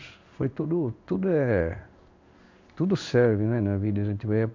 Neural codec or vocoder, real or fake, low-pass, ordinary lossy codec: codec, 16 kHz in and 24 kHz out, 1 kbps, XY-Tokenizer; fake; 7.2 kHz; MP3, 64 kbps